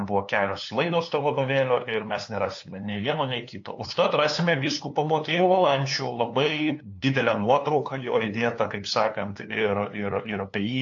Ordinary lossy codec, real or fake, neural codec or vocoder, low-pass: AAC, 48 kbps; fake; codec, 16 kHz, 2 kbps, FunCodec, trained on LibriTTS, 25 frames a second; 7.2 kHz